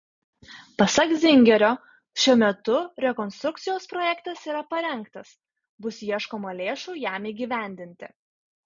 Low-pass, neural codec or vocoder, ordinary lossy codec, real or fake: 7.2 kHz; none; MP3, 64 kbps; real